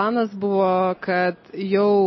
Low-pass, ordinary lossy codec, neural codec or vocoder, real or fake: 7.2 kHz; MP3, 24 kbps; none; real